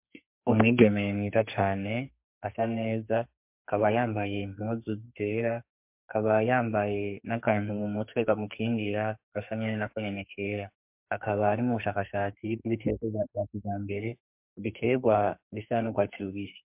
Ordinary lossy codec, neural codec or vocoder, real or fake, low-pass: MP3, 32 kbps; codec, 44.1 kHz, 2.6 kbps, SNAC; fake; 3.6 kHz